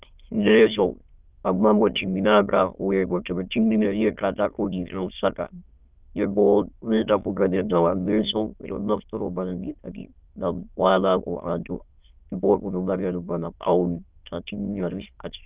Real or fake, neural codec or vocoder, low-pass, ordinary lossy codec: fake; autoencoder, 22.05 kHz, a latent of 192 numbers a frame, VITS, trained on many speakers; 3.6 kHz; Opus, 24 kbps